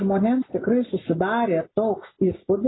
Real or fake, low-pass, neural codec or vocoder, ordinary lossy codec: real; 7.2 kHz; none; AAC, 16 kbps